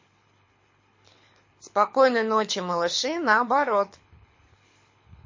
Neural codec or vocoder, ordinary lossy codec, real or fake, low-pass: codec, 24 kHz, 6 kbps, HILCodec; MP3, 32 kbps; fake; 7.2 kHz